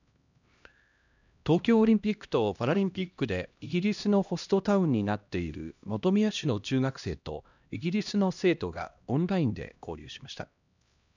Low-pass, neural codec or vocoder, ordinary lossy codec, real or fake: 7.2 kHz; codec, 16 kHz, 1 kbps, X-Codec, HuBERT features, trained on LibriSpeech; none; fake